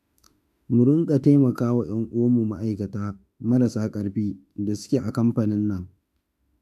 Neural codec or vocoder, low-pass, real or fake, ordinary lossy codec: autoencoder, 48 kHz, 32 numbers a frame, DAC-VAE, trained on Japanese speech; 14.4 kHz; fake; none